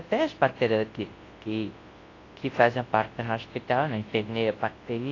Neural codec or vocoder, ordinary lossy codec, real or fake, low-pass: codec, 24 kHz, 0.9 kbps, WavTokenizer, large speech release; AAC, 32 kbps; fake; 7.2 kHz